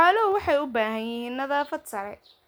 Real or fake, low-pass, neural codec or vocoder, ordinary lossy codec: real; none; none; none